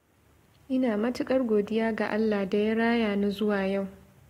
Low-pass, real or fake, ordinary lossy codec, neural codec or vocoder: 19.8 kHz; real; AAC, 48 kbps; none